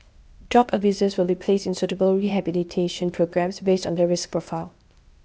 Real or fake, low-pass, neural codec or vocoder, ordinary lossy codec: fake; none; codec, 16 kHz, 0.8 kbps, ZipCodec; none